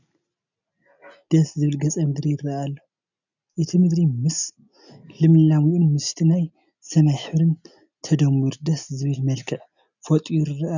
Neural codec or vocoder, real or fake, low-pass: none; real; 7.2 kHz